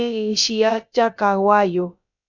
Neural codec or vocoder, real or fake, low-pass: codec, 16 kHz, about 1 kbps, DyCAST, with the encoder's durations; fake; 7.2 kHz